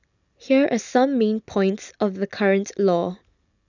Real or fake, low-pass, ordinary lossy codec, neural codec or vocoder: real; 7.2 kHz; none; none